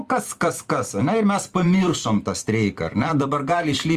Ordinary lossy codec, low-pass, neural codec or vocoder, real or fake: Opus, 32 kbps; 14.4 kHz; vocoder, 44.1 kHz, 128 mel bands every 256 samples, BigVGAN v2; fake